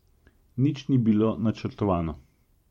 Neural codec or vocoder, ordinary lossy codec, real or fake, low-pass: vocoder, 44.1 kHz, 128 mel bands every 512 samples, BigVGAN v2; MP3, 64 kbps; fake; 19.8 kHz